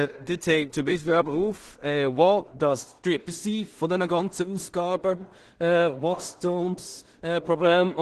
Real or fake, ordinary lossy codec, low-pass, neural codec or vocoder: fake; Opus, 16 kbps; 10.8 kHz; codec, 16 kHz in and 24 kHz out, 0.4 kbps, LongCat-Audio-Codec, two codebook decoder